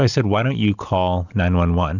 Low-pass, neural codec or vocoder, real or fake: 7.2 kHz; none; real